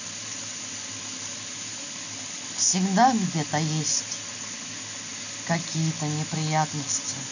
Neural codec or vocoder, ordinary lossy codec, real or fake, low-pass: none; none; real; 7.2 kHz